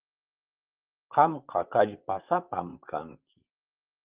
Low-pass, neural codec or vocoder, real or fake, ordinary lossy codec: 3.6 kHz; vocoder, 44.1 kHz, 128 mel bands, Pupu-Vocoder; fake; Opus, 24 kbps